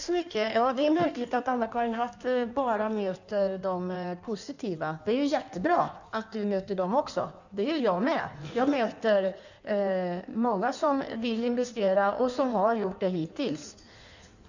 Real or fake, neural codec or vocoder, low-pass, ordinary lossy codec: fake; codec, 16 kHz in and 24 kHz out, 1.1 kbps, FireRedTTS-2 codec; 7.2 kHz; none